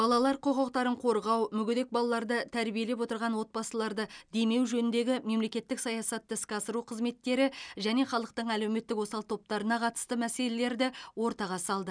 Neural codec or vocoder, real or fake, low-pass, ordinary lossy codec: none; real; 9.9 kHz; none